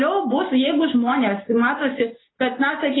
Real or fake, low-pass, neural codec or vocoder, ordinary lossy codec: real; 7.2 kHz; none; AAC, 16 kbps